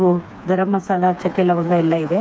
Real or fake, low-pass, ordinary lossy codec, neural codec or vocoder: fake; none; none; codec, 16 kHz, 4 kbps, FreqCodec, smaller model